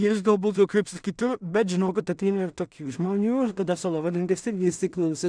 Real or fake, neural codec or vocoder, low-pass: fake; codec, 16 kHz in and 24 kHz out, 0.4 kbps, LongCat-Audio-Codec, two codebook decoder; 9.9 kHz